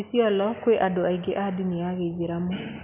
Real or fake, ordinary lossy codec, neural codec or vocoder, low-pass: real; none; none; 3.6 kHz